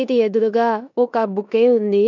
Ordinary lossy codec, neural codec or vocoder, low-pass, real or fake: none; codec, 16 kHz in and 24 kHz out, 0.9 kbps, LongCat-Audio-Codec, four codebook decoder; 7.2 kHz; fake